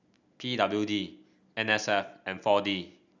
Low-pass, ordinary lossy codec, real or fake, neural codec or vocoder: 7.2 kHz; none; real; none